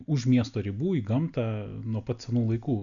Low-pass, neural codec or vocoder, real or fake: 7.2 kHz; none; real